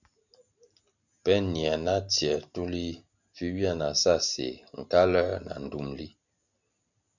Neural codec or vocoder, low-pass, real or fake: none; 7.2 kHz; real